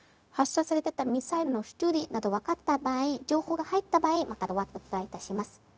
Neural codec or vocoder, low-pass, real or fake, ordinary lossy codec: codec, 16 kHz, 0.4 kbps, LongCat-Audio-Codec; none; fake; none